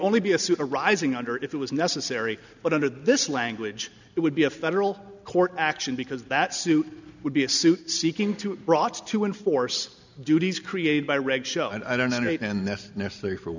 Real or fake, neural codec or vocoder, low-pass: real; none; 7.2 kHz